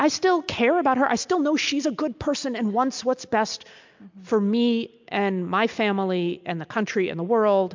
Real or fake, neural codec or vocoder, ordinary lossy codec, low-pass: real; none; MP3, 64 kbps; 7.2 kHz